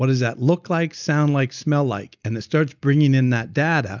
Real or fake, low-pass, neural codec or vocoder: real; 7.2 kHz; none